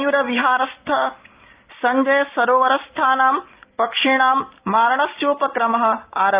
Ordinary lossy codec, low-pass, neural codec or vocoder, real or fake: Opus, 32 kbps; 3.6 kHz; none; real